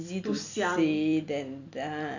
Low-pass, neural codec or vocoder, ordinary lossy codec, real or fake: 7.2 kHz; vocoder, 44.1 kHz, 128 mel bands every 256 samples, BigVGAN v2; none; fake